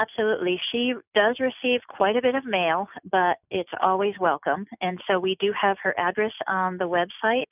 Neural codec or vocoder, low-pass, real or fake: none; 3.6 kHz; real